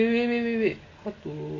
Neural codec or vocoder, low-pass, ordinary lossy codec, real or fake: none; 7.2 kHz; AAC, 32 kbps; real